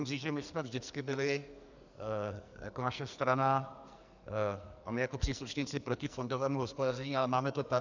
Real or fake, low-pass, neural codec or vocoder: fake; 7.2 kHz; codec, 44.1 kHz, 2.6 kbps, SNAC